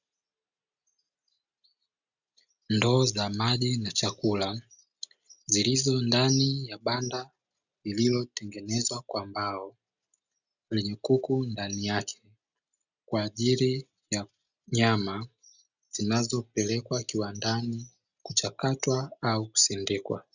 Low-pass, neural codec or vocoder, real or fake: 7.2 kHz; none; real